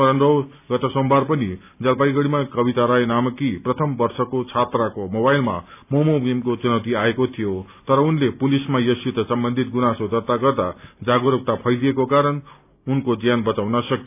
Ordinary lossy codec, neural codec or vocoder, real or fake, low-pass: none; none; real; 3.6 kHz